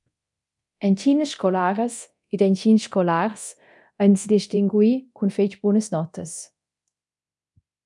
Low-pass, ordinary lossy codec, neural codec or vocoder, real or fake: 10.8 kHz; MP3, 96 kbps; codec, 24 kHz, 0.9 kbps, DualCodec; fake